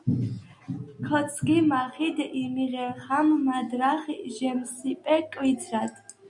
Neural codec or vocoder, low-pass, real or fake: none; 10.8 kHz; real